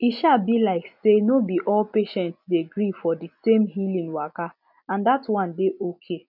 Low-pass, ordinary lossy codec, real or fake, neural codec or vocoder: 5.4 kHz; none; real; none